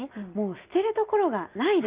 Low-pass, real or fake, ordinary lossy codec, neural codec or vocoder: 3.6 kHz; real; Opus, 32 kbps; none